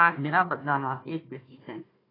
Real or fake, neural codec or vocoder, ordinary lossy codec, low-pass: fake; codec, 16 kHz, 1 kbps, FunCodec, trained on Chinese and English, 50 frames a second; AAC, 24 kbps; 5.4 kHz